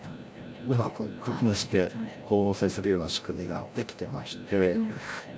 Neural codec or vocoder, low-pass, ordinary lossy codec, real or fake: codec, 16 kHz, 0.5 kbps, FreqCodec, larger model; none; none; fake